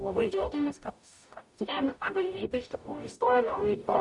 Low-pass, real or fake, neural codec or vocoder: 10.8 kHz; fake; codec, 44.1 kHz, 0.9 kbps, DAC